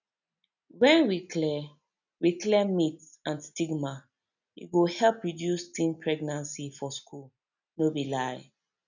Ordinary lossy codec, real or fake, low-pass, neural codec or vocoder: none; real; 7.2 kHz; none